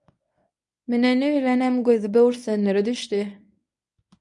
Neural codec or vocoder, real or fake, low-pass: codec, 24 kHz, 0.9 kbps, WavTokenizer, medium speech release version 1; fake; 10.8 kHz